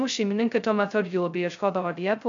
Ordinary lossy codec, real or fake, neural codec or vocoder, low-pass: AAC, 64 kbps; fake; codec, 16 kHz, 0.2 kbps, FocalCodec; 7.2 kHz